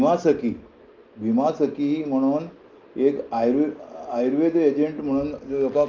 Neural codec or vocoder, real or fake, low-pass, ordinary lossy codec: none; real; 7.2 kHz; Opus, 24 kbps